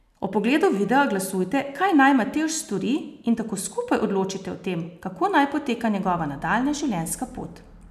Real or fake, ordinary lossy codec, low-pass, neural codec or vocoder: real; none; 14.4 kHz; none